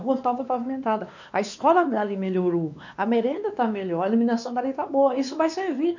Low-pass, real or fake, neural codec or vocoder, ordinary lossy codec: 7.2 kHz; fake; codec, 16 kHz, 2 kbps, X-Codec, WavLM features, trained on Multilingual LibriSpeech; none